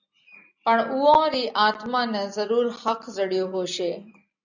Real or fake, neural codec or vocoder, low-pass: real; none; 7.2 kHz